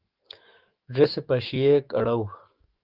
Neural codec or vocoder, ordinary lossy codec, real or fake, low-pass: autoencoder, 48 kHz, 128 numbers a frame, DAC-VAE, trained on Japanese speech; Opus, 32 kbps; fake; 5.4 kHz